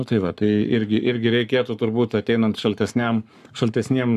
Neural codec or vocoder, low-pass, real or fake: codec, 44.1 kHz, 7.8 kbps, Pupu-Codec; 14.4 kHz; fake